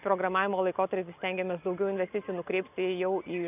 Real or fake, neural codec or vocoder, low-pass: real; none; 3.6 kHz